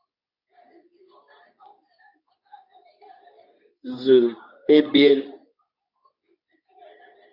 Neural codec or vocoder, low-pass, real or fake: codec, 24 kHz, 0.9 kbps, WavTokenizer, medium speech release version 2; 5.4 kHz; fake